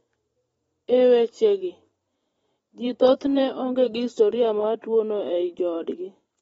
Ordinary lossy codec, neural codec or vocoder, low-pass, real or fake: AAC, 24 kbps; vocoder, 44.1 kHz, 128 mel bands every 512 samples, BigVGAN v2; 19.8 kHz; fake